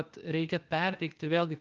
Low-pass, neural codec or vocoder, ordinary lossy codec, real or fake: 7.2 kHz; codec, 16 kHz, 0.8 kbps, ZipCodec; Opus, 24 kbps; fake